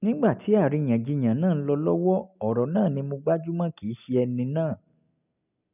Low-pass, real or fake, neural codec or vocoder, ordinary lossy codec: 3.6 kHz; real; none; none